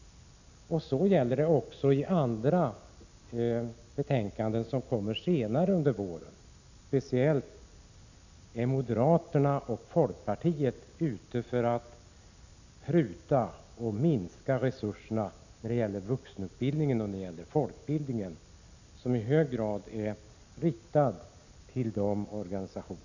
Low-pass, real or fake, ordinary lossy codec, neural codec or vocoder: 7.2 kHz; real; none; none